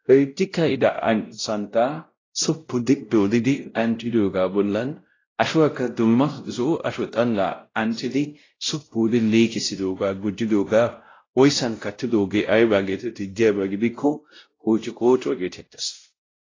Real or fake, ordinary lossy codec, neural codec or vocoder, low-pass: fake; AAC, 32 kbps; codec, 16 kHz, 0.5 kbps, X-Codec, WavLM features, trained on Multilingual LibriSpeech; 7.2 kHz